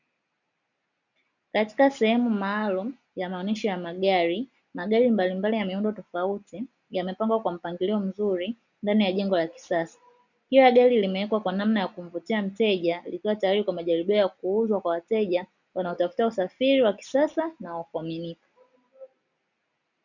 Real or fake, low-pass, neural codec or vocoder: real; 7.2 kHz; none